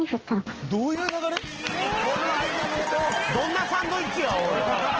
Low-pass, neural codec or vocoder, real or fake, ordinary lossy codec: 7.2 kHz; none; real; Opus, 16 kbps